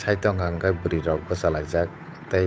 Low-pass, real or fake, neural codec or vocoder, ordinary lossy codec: none; fake; codec, 16 kHz, 8 kbps, FunCodec, trained on Chinese and English, 25 frames a second; none